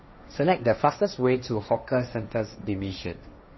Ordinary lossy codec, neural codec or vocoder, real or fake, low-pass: MP3, 24 kbps; codec, 16 kHz, 1.1 kbps, Voila-Tokenizer; fake; 7.2 kHz